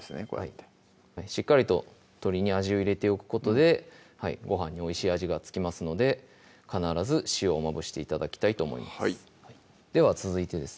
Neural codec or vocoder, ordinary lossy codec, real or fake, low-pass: none; none; real; none